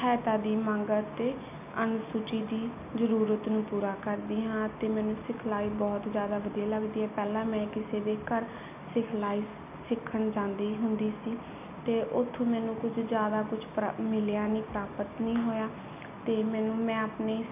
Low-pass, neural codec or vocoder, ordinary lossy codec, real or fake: 3.6 kHz; none; none; real